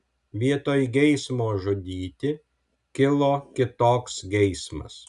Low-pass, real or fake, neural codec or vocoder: 10.8 kHz; real; none